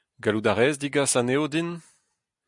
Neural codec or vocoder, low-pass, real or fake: none; 10.8 kHz; real